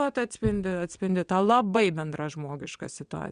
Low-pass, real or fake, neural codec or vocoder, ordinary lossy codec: 9.9 kHz; fake; vocoder, 22.05 kHz, 80 mel bands, WaveNeXt; Opus, 64 kbps